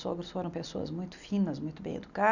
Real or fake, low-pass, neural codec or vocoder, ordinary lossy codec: real; 7.2 kHz; none; none